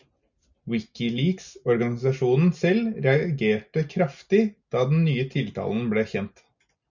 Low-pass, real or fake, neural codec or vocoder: 7.2 kHz; real; none